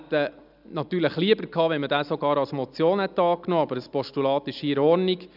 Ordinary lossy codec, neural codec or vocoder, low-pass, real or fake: none; none; 5.4 kHz; real